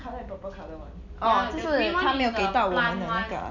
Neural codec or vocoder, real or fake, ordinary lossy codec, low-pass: none; real; none; 7.2 kHz